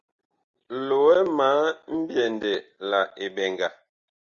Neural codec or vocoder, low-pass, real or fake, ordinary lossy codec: none; 7.2 kHz; real; Opus, 64 kbps